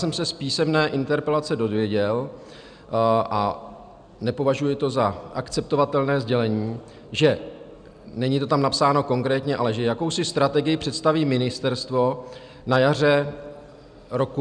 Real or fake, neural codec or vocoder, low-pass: real; none; 9.9 kHz